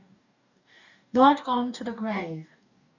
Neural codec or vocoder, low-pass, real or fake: codec, 44.1 kHz, 2.6 kbps, DAC; 7.2 kHz; fake